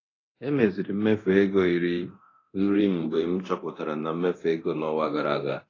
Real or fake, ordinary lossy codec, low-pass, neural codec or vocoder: fake; AAC, 32 kbps; 7.2 kHz; codec, 24 kHz, 0.9 kbps, DualCodec